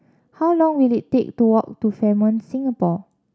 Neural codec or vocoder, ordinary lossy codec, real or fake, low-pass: none; none; real; none